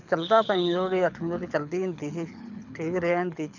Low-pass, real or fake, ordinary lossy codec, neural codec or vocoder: 7.2 kHz; fake; none; codec, 24 kHz, 6 kbps, HILCodec